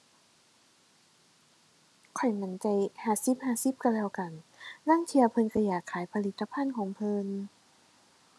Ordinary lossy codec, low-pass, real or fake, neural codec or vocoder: none; none; real; none